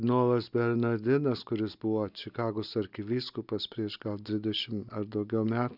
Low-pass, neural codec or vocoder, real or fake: 5.4 kHz; none; real